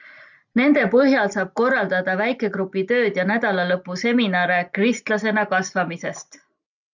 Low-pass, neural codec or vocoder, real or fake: 7.2 kHz; none; real